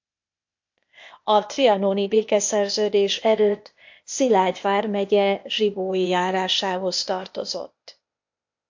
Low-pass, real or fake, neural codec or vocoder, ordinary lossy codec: 7.2 kHz; fake; codec, 16 kHz, 0.8 kbps, ZipCodec; MP3, 48 kbps